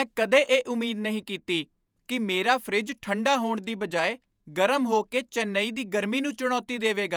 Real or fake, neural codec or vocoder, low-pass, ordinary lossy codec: fake; vocoder, 48 kHz, 128 mel bands, Vocos; none; none